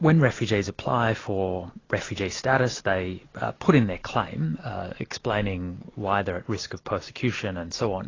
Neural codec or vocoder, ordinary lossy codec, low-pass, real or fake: none; AAC, 32 kbps; 7.2 kHz; real